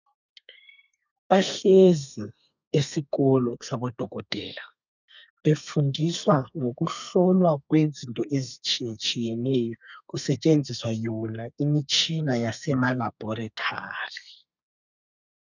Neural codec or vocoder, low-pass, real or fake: codec, 32 kHz, 1.9 kbps, SNAC; 7.2 kHz; fake